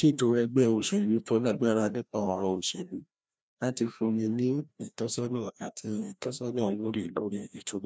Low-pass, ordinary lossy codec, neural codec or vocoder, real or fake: none; none; codec, 16 kHz, 1 kbps, FreqCodec, larger model; fake